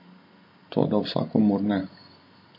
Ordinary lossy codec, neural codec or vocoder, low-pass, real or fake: MP3, 32 kbps; none; 5.4 kHz; real